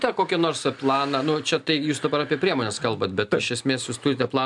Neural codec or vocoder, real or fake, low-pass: none; real; 10.8 kHz